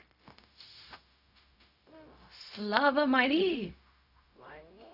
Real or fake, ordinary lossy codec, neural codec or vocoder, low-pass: fake; none; codec, 16 kHz, 0.4 kbps, LongCat-Audio-Codec; 5.4 kHz